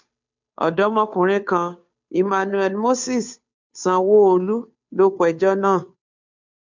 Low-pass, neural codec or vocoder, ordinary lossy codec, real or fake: 7.2 kHz; codec, 16 kHz, 2 kbps, FunCodec, trained on Chinese and English, 25 frames a second; MP3, 64 kbps; fake